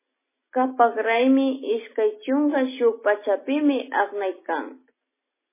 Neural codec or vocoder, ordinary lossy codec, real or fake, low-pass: none; MP3, 16 kbps; real; 3.6 kHz